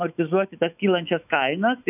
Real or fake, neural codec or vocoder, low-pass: fake; autoencoder, 48 kHz, 128 numbers a frame, DAC-VAE, trained on Japanese speech; 3.6 kHz